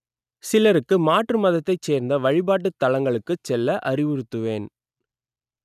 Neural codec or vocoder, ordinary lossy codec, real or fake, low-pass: none; none; real; 14.4 kHz